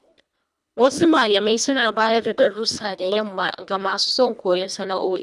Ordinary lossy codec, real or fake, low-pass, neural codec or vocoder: none; fake; none; codec, 24 kHz, 1.5 kbps, HILCodec